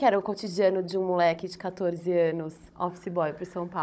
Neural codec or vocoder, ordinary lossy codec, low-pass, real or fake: codec, 16 kHz, 16 kbps, FunCodec, trained on Chinese and English, 50 frames a second; none; none; fake